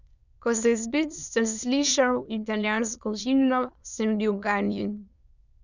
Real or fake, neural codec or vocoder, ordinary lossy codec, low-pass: fake; autoencoder, 22.05 kHz, a latent of 192 numbers a frame, VITS, trained on many speakers; none; 7.2 kHz